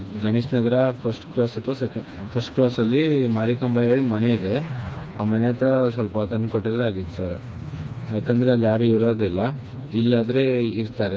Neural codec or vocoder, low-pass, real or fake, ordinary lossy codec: codec, 16 kHz, 2 kbps, FreqCodec, smaller model; none; fake; none